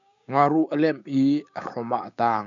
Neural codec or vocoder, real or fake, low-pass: codec, 16 kHz, 6 kbps, DAC; fake; 7.2 kHz